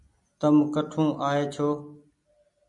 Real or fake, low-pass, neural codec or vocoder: real; 10.8 kHz; none